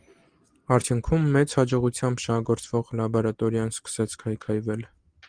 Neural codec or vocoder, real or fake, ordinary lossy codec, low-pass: none; real; Opus, 24 kbps; 9.9 kHz